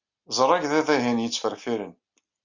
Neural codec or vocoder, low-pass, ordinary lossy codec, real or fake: none; 7.2 kHz; Opus, 64 kbps; real